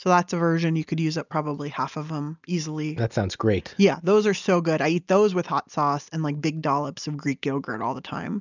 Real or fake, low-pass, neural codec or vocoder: real; 7.2 kHz; none